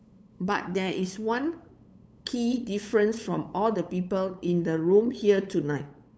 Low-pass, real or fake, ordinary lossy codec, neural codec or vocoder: none; fake; none; codec, 16 kHz, 8 kbps, FunCodec, trained on LibriTTS, 25 frames a second